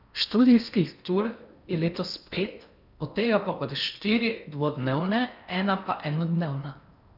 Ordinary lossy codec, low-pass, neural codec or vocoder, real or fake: none; 5.4 kHz; codec, 16 kHz in and 24 kHz out, 0.8 kbps, FocalCodec, streaming, 65536 codes; fake